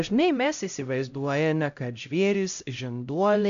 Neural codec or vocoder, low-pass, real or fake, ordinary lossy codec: codec, 16 kHz, 0.5 kbps, X-Codec, HuBERT features, trained on LibriSpeech; 7.2 kHz; fake; MP3, 96 kbps